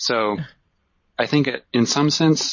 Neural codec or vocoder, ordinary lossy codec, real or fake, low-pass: vocoder, 44.1 kHz, 128 mel bands every 512 samples, BigVGAN v2; MP3, 32 kbps; fake; 7.2 kHz